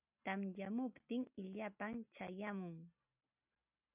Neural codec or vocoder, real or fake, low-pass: none; real; 3.6 kHz